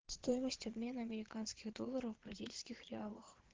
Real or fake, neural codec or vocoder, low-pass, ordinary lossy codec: fake; vocoder, 22.05 kHz, 80 mel bands, Vocos; 7.2 kHz; Opus, 16 kbps